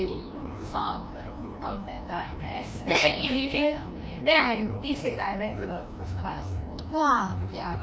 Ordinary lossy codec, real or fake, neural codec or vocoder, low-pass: none; fake; codec, 16 kHz, 1 kbps, FreqCodec, larger model; none